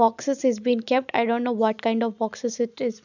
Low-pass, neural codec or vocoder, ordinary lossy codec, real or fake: 7.2 kHz; none; none; real